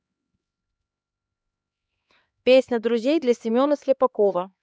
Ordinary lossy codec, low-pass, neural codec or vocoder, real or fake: none; none; codec, 16 kHz, 2 kbps, X-Codec, HuBERT features, trained on LibriSpeech; fake